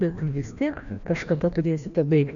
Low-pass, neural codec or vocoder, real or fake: 7.2 kHz; codec, 16 kHz, 1 kbps, FreqCodec, larger model; fake